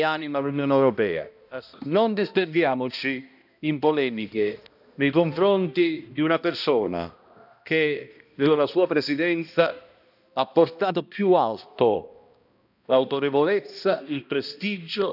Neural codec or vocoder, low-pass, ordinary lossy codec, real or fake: codec, 16 kHz, 1 kbps, X-Codec, HuBERT features, trained on balanced general audio; 5.4 kHz; none; fake